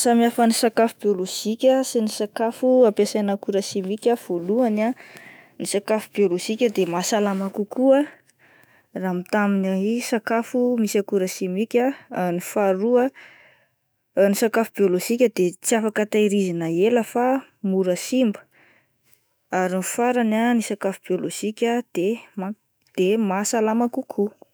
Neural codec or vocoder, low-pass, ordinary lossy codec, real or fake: autoencoder, 48 kHz, 128 numbers a frame, DAC-VAE, trained on Japanese speech; none; none; fake